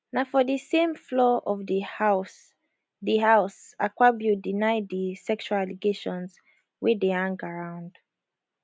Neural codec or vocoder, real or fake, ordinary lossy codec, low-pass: none; real; none; none